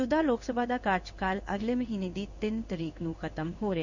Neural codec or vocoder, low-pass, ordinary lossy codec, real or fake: codec, 16 kHz in and 24 kHz out, 1 kbps, XY-Tokenizer; 7.2 kHz; none; fake